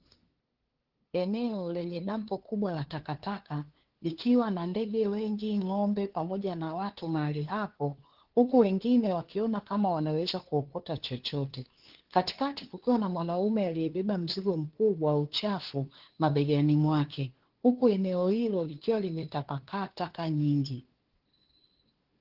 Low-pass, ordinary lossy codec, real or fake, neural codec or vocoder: 5.4 kHz; Opus, 16 kbps; fake; codec, 16 kHz, 2 kbps, FunCodec, trained on LibriTTS, 25 frames a second